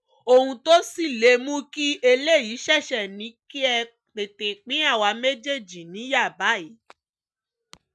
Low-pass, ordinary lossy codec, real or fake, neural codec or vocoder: none; none; real; none